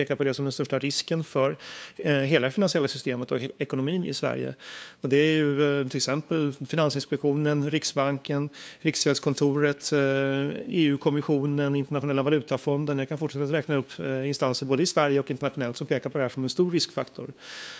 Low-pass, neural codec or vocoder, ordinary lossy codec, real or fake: none; codec, 16 kHz, 2 kbps, FunCodec, trained on LibriTTS, 25 frames a second; none; fake